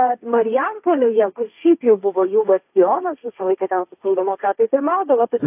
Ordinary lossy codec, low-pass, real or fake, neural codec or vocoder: AAC, 32 kbps; 3.6 kHz; fake; codec, 16 kHz, 1.1 kbps, Voila-Tokenizer